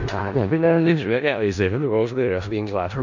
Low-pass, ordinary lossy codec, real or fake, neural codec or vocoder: 7.2 kHz; none; fake; codec, 16 kHz in and 24 kHz out, 0.4 kbps, LongCat-Audio-Codec, four codebook decoder